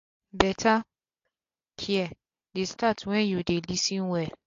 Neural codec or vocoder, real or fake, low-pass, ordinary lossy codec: none; real; 7.2 kHz; AAC, 48 kbps